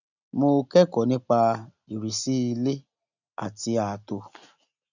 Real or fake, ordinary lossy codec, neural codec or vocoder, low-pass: real; none; none; 7.2 kHz